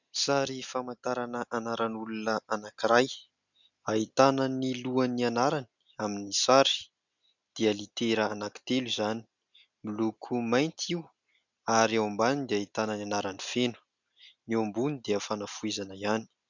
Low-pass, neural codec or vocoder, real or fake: 7.2 kHz; none; real